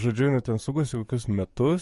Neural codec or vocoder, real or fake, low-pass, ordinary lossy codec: codec, 44.1 kHz, 7.8 kbps, DAC; fake; 14.4 kHz; MP3, 48 kbps